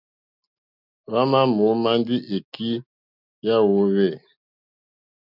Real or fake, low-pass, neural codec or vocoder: real; 5.4 kHz; none